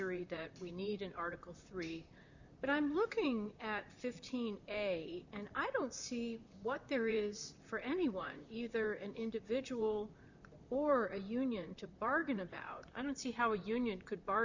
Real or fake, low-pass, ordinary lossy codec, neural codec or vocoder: fake; 7.2 kHz; Opus, 64 kbps; vocoder, 44.1 kHz, 128 mel bands, Pupu-Vocoder